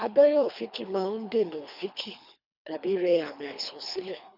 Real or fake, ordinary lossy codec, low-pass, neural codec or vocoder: fake; none; 5.4 kHz; codec, 24 kHz, 3 kbps, HILCodec